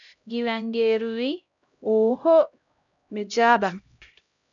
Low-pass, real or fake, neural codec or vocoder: 7.2 kHz; fake; codec, 16 kHz, 0.5 kbps, X-Codec, HuBERT features, trained on LibriSpeech